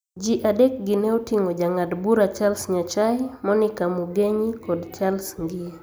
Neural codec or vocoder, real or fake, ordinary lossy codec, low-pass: none; real; none; none